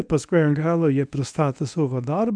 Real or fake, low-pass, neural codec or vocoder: fake; 9.9 kHz; codec, 24 kHz, 0.9 kbps, WavTokenizer, medium speech release version 1